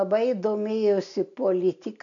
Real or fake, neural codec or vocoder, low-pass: real; none; 7.2 kHz